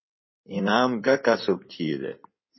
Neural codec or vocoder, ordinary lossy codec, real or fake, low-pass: codec, 16 kHz in and 24 kHz out, 2.2 kbps, FireRedTTS-2 codec; MP3, 24 kbps; fake; 7.2 kHz